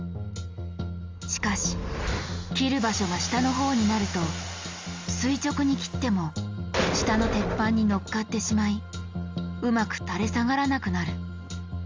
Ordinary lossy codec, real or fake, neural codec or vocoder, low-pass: Opus, 32 kbps; real; none; 7.2 kHz